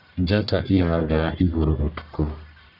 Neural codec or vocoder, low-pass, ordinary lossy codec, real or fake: codec, 44.1 kHz, 1.7 kbps, Pupu-Codec; 5.4 kHz; none; fake